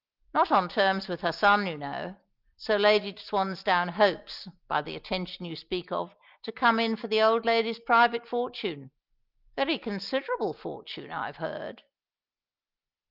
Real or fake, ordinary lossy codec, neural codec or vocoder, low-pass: real; Opus, 32 kbps; none; 5.4 kHz